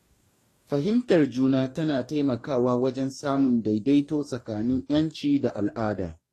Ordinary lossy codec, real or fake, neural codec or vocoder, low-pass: AAC, 48 kbps; fake; codec, 44.1 kHz, 2.6 kbps, DAC; 14.4 kHz